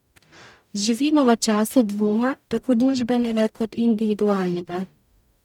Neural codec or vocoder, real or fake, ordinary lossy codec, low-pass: codec, 44.1 kHz, 0.9 kbps, DAC; fake; none; 19.8 kHz